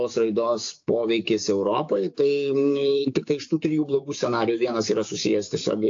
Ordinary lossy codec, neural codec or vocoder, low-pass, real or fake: AAC, 48 kbps; codec, 16 kHz, 6 kbps, DAC; 7.2 kHz; fake